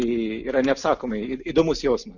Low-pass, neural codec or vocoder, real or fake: 7.2 kHz; none; real